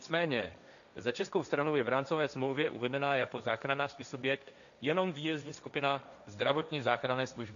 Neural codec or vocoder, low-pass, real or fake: codec, 16 kHz, 1.1 kbps, Voila-Tokenizer; 7.2 kHz; fake